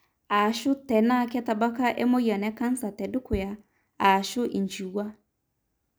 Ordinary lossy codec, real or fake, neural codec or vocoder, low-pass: none; real; none; none